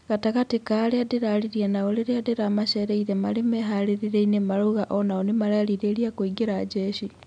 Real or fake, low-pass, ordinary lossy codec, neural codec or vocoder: real; 9.9 kHz; none; none